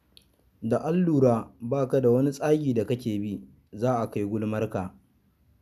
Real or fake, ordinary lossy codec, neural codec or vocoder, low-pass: real; none; none; 14.4 kHz